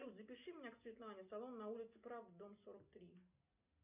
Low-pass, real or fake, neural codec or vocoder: 3.6 kHz; real; none